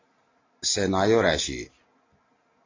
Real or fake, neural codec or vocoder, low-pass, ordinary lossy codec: real; none; 7.2 kHz; AAC, 32 kbps